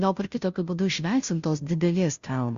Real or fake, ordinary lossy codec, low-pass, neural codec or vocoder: fake; Opus, 64 kbps; 7.2 kHz; codec, 16 kHz, 0.5 kbps, FunCodec, trained on Chinese and English, 25 frames a second